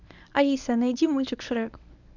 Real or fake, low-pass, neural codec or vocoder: fake; 7.2 kHz; codec, 24 kHz, 0.9 kbps, WavTokenizer, medium speech release version 1